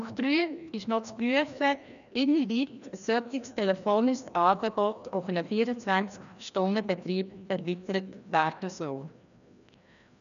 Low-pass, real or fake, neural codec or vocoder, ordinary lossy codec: 7.2 kHz; fake; codec, 16 kHz, 1 kbps, FreqCodec, larger model; none